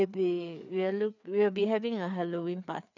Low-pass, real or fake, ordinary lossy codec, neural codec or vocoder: 7.2 kHz; fake; none; codec, 16 kHz, 4 kbps, FreqCodec, larger model